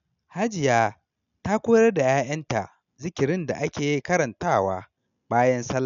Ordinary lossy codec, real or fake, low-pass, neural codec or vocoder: none; real; 7.2 kHz; none